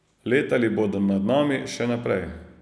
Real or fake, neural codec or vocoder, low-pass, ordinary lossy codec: real; none; none; none